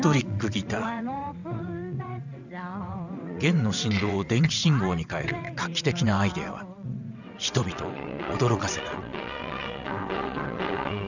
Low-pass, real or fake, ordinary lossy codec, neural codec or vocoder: 7.2 kHz; fake; none; vocoder, 22.05 kHz, 80 mel bands, WaveNeXt